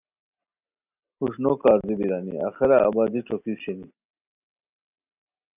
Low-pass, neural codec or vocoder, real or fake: 3.6 kHz; none; real